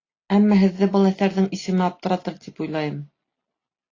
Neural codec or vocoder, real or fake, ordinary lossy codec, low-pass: none; real; AAC, 32 kbps; 7.2 kHz